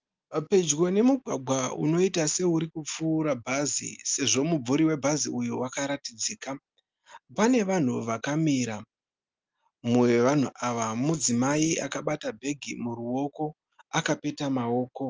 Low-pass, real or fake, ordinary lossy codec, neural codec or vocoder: 7.2 kHz; real; Opus, 24 kbps; none